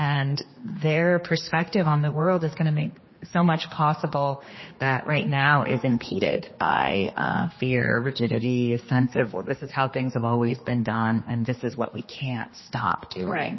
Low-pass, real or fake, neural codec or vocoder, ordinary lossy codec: 7.2 kHz; fake; codec, 16 kHz, 2 kbps, X-Codec, HuBERT features, trained on general audio; MP3, 24 kbps